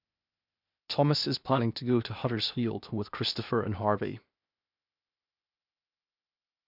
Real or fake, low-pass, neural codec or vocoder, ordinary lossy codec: fake; 5.4 kHz; codec, 16 kHz, 0.8 kbps, ZipCodec; none